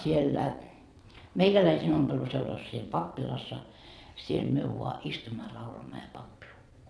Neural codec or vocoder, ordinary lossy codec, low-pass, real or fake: vocoder, 22.05 kHz, 80 mel bands, Vocos; none; none; fake